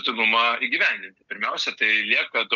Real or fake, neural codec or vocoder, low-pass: real; none; 7.2 kHz